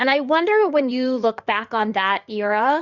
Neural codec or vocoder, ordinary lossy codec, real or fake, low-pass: codec, 16 kHz in and 24 kHz out, 2.2 kbps, FireRedTTS-2 codec; Opus, 64 kbps; fake; 7.2 kHz